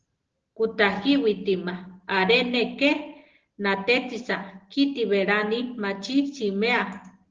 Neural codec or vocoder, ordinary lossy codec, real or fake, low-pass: none; Opus, 16 kbps; real; 7.2 kHz